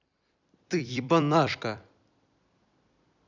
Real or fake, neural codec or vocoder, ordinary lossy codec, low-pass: fake; vocoder, 44.1 kHz, 128 mel bands every 256 samples, BigVGAN v2; none; 7.2 kHz